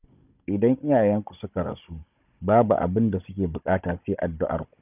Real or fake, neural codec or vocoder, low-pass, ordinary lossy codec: fake; codec, 16 kHz, 16 kbps, FunCodec, trained on Chinese and English, 50 frames a second; 3.6 kHz; none